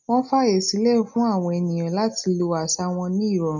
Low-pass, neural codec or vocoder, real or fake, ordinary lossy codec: 7.2 kHz; none; real; none